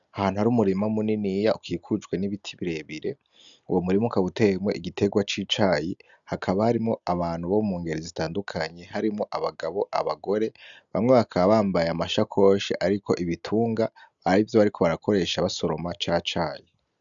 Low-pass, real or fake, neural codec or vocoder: 7.2 kHz; real; none